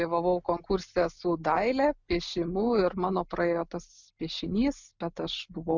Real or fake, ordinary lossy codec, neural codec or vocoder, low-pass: real; Opus, 64 kbps; none; 7.2 kHz